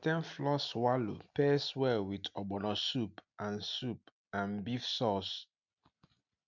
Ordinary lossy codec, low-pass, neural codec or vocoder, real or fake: none; 7.2 kHz; none; real